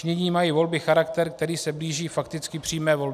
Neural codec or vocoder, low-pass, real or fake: none; 14.4 kHz; real